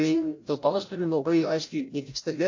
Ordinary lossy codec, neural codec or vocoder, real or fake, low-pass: AAC, 48 kbps; codec, 16 kHz, 0.5 kbps, FreqCodec, larger model; fake; 7.2 kHz